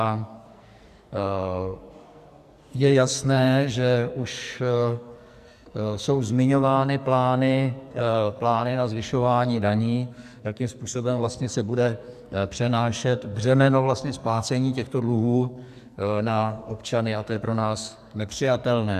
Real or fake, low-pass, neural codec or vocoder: fake; 14.4 kHz; codec, 44.1 kHz, 2.6 kbps, SNAC